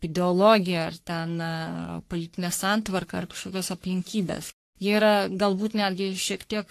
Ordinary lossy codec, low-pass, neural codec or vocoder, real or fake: AAC, 64 kbps; 14.4 kHz; codec, 44.1 kHz, 3.4 kbps, Pupu-Codec; fake